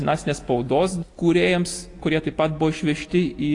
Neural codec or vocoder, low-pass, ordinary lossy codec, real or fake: none; 10.8 kHz; AAC, 48 kbps; real